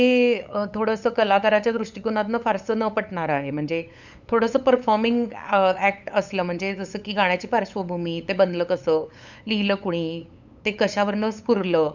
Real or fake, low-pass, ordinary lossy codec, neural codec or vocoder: fake; 7.2 kHz; none; codec, 16 kHz, 8 kbps, FunCodec, trained on LibriTTS, 25 frames a second